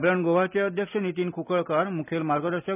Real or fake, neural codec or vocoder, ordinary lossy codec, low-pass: real; none; none; 3.6 kHz